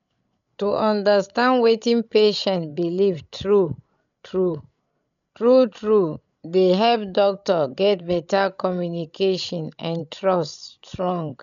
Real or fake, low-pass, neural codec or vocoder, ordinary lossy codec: fake; 7.2 kHz; codec, 16 kHz, 16 kbps, FreqCodec, larger model; none